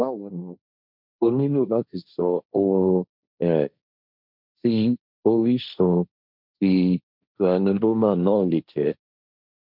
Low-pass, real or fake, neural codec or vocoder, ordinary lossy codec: 5.4 kHz; fake; codec, 16 kHz, 1.1 kbps, Voila-Tokenizer; none